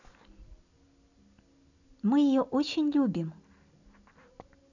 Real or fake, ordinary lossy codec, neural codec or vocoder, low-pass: real; none; none; 7.2 kHz